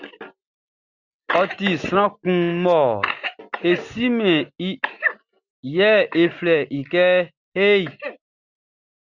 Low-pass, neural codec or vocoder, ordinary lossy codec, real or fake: 7.2 kHz; none; Opus, 64 kbps; real